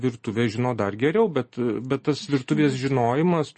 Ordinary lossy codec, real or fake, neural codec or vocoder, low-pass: MP3, 32 kbps; real; none; 10.8 kHz